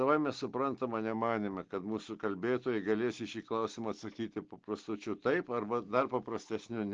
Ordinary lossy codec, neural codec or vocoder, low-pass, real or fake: Opus, 16 kbps; none; 7.2 kHz; real